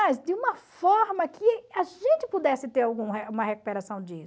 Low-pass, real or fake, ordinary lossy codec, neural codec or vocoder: none; real; none; none